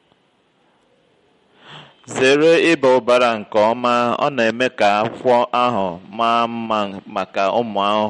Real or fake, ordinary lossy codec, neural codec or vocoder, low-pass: real; MP3, 48 kbps; none; 19.8 kHz